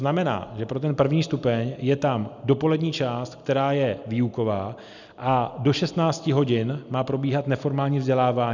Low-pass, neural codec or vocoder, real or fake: 7.2 kHz; none; real